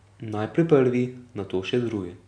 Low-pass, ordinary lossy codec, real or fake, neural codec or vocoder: 9.9 kHz; none; real; none